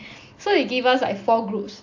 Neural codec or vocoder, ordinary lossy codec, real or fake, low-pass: none; AAC, 48 kbps; real; 7.2 kHz